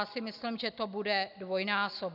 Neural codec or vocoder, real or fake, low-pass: none; real; 5.4 kHz